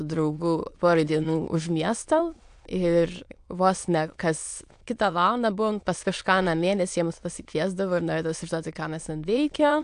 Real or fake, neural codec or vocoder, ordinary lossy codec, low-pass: fake; autoencoder, 22.05 kHz, a latent of 192 numbers a frame, VITS, trained on many speakers; AAC, 64 kbps; 9.9 kHz